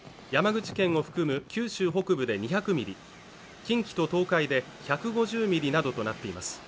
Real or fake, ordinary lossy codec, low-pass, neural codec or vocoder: real; none; none; none